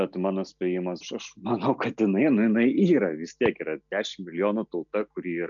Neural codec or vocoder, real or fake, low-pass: none; real; 7.2 kHz